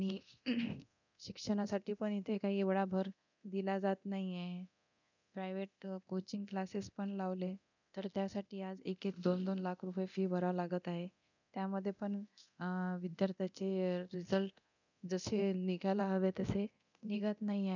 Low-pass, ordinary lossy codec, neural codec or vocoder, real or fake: 7.2 kHz; none; codec, 24 kHz, 0.9 kbps, DualCodec; fake